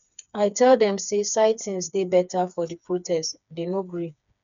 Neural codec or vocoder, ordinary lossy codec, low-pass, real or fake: codec, 16 kHz, 4 kbps, FreqCodec, smaller model; none; 7.2 kHz; fake